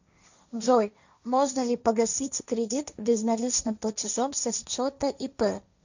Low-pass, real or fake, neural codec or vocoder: 7.2 kHz; fake; codec, 16 kHz, 1.1 kbps, Voila-Tokenizer